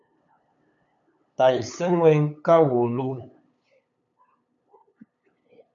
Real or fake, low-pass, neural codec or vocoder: fake; 7.2 kHz; codec, 16 kHz, 8 kbps, FunCodec, trained on LibriTTS, 25 frames a second